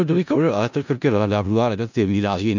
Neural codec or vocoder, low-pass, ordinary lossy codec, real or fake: codec, 16 kHz in and 24 kHz out, 0.4 kbps, LongCat-Audio-Codec, four codebook decoder; 7.2 kHz; none; fake